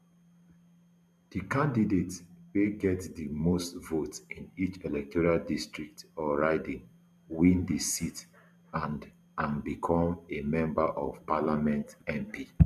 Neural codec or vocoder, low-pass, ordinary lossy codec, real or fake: vocoder, 44.1 kHz, 128 mel bands every 512 samples, BigVGAN v2; 14.4 kHz; none; fake